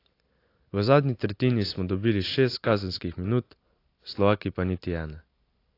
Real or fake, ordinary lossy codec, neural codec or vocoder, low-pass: real; AAC, 32 kbps; none; 5.4 kHz